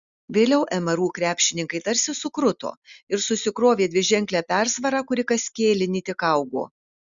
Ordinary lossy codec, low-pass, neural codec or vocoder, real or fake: Opus, 64 kbps; 7.2 kHz; none; real